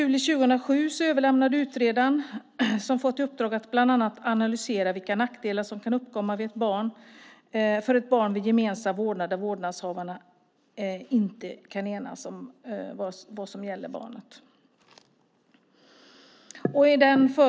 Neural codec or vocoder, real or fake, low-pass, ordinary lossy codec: none; real; none; none